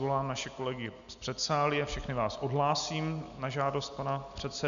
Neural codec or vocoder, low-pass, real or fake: none; 7.2 kHz; real